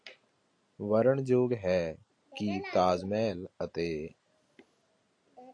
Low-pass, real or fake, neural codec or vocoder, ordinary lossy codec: 9.9 kHz; real; none; AAC, 48 kbps